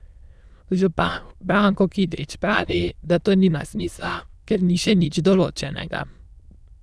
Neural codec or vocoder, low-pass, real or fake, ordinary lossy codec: autoencoder, 22.05 kHz, a latent of 192 numbers a frame, VITS, trained on many speakers; none; fake; none